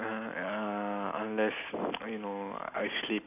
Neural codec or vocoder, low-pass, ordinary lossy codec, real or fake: none; 3.6 kHz; none; real